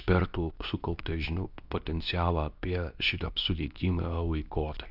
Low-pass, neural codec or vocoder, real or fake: 5.4 kHz; codec, 24 kHz, 0.9 kbps, WavTokenizer, medium speech release version 2; fake